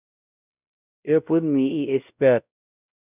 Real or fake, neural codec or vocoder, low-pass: fake; codec, 16 kHz, 0.5 kbps, X-Codec, WavLM features, trained on Multilingual LibriSpeech; 3.6 kHz